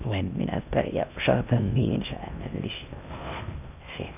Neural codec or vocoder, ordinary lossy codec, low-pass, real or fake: codec, 16 kHz in and 24 kHz out, 0.6 kbps, FocalCodec, streaming, 2048 codes; none; 3.6 kHz; fake